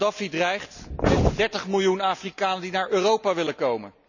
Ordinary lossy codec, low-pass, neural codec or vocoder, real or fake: none; 7.2 kHz; none; real